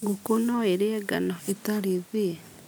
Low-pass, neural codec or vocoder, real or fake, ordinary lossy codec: none; none; real; none